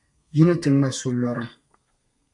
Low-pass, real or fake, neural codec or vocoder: 10.8 kHz; fake; codec, 32 kHz, 1.9 kbps, SNAC